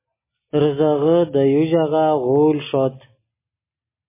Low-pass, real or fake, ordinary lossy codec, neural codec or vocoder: 3.6 kHz; real; MP3, 16 kbps; none